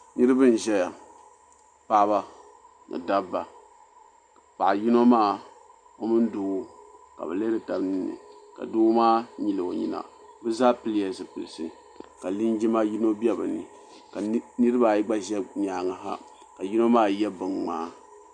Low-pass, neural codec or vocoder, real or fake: 9.9 kHz; none; real